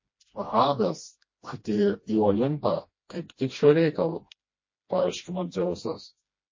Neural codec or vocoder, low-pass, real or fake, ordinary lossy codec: codec, 16 kHz, 1 kbps, FreqCodec, smaller model; 7.2 kHz; fake; MP3, 32 kbps